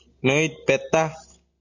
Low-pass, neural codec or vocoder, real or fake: 7.2 kHz; none; real